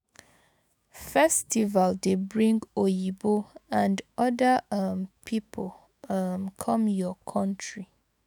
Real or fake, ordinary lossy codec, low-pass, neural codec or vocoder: fake; none; none; autoencoder, 48 kHz, 128 numbers a frame, DAC-VAE, trained on Japanese speech